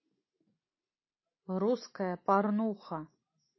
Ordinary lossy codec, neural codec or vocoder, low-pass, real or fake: MP3, 24 kbps; none; 7.2 kHz; real